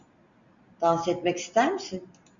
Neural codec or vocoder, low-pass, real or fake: none; 7.2 kHz; real